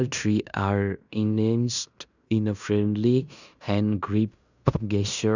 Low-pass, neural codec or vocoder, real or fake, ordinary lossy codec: 7.2 kHz; codec, 16 kHz in and 24 kHz out, 0.9 kbps, LongCat-Audio-Codec, fine tuned four codebook decoder; fake; none